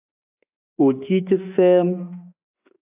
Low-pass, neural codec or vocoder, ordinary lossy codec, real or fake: 3.6 kHz; autoencoder, 48 kHz, 32 numbers a frame, DAC-VAE, trained on Japanese speech; AAC, 32 kbps; fake